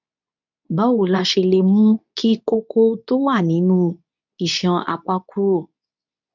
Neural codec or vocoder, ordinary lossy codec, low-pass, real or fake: codec, 24 kHz, 0.9 kbps, WavTokenizer, medium speech release version 2; none; 7.2 kHz; fake